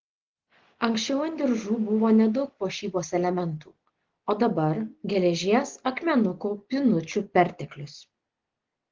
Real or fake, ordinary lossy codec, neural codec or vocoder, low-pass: real; Opus, 16 kbps; none; 7.2 kHz